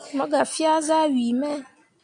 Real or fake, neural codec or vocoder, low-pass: real; none; 9.9 kHz